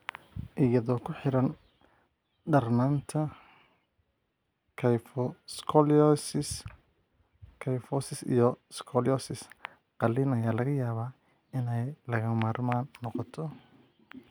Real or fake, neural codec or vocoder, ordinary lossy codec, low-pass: real; none; none; none